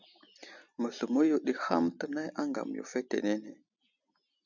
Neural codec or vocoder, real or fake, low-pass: none; real; 7.2 kHz